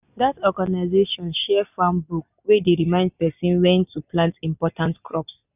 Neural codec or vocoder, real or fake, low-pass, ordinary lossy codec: none; real; 3.6 kHz; none